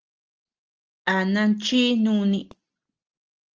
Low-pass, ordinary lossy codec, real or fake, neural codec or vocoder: 7.2 kHz; Opus, 16 kbps; real; none